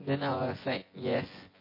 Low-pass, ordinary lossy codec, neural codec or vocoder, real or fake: 5.4 kHz; MP3, 24 kbps; vocoder, 24 kHz, 100 mel bands, Vocos; fake